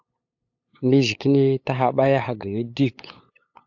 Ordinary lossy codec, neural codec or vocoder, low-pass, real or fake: AAC, 48 kbps; codec, 16 kHz, 8 kbps, FunCodec, trained on LibriTTS, 25 frames a second; 7.2 kHz; fake